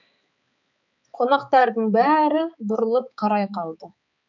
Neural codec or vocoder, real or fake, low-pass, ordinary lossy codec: codec, 16 kHz, 4 kbps, X-Codec, HuBERT features, trained on balanced general audio; fake; 7.2 kHz; none